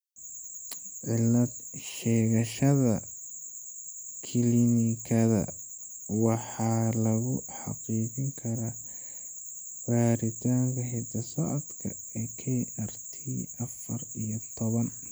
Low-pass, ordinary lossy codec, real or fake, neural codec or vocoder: none; none; real; none